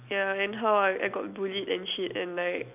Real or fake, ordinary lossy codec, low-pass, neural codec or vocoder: real; none; 3.6 kHz; none